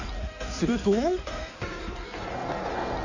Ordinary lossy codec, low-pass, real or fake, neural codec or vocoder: none; 7.2 kHz; fake; codec, 16 kHz in and 24 kHz out, 1.1 kbps, FireRedTTS-2 codec